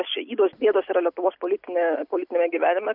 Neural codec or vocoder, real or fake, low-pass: none; real; 5.4 kHz